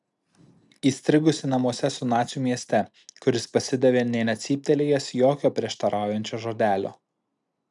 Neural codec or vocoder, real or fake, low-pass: none; real; 10.8 kHz